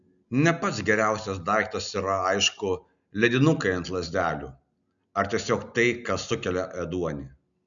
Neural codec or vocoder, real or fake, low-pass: none; real; 7.2 kHz